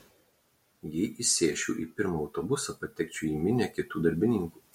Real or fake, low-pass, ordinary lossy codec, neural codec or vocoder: real; 19.8 kHz; MP3, 64 kbps; none